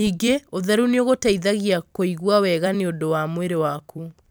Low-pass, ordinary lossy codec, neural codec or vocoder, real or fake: none; none; vocoder, 44.1 kHz, 128 mel bands every 256 samples, BigVGAN v2; fake